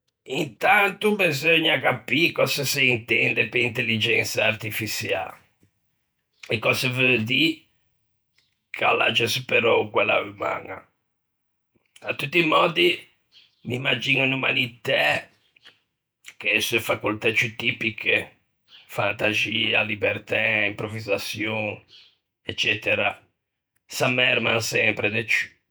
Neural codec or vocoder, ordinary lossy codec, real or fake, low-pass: vocoder, 48 kHz, 128 mel bands, Vocos; none; fake; none